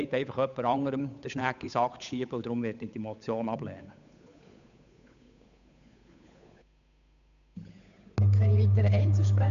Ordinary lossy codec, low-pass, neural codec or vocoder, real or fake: none; 7.2 kHz; codec, 16 kHz, 8 kbps, FunCodec, trained on Chinese and English, 25 frames a second; fake